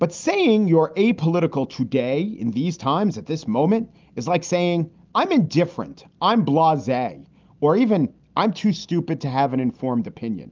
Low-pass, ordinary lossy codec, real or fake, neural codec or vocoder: 7.2 kHz; Opus, 24 kbps; real; none